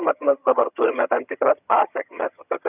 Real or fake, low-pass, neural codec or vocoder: fake; 3.6 kHz; vocoder, 22.05 kHz, 80 mel bands, HiFi-GAN